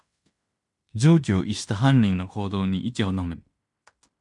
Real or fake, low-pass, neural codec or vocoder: fake; 10.8 kHz; codec, 16 kHz in and 24 kHz out, 0.9 kbps, LongCat-Audio-Codec, fine tuned four codebook decoder